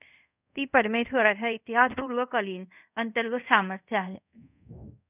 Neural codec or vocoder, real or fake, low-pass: codec, 24 kHz, 0.5 kbps, DualCodec; fake; 3.6 kHz